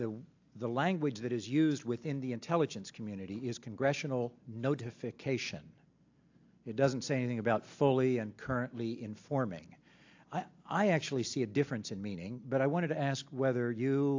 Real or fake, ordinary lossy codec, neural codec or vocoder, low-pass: real; AAC, 48 kbps; none; 7.2 kHz